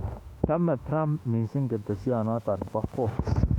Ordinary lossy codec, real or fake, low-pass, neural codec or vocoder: none; fake; 19.8 kHz; autoencoder, 48 kHz, 32 numbers a frame, DAC-VAE, trained on Japanese speech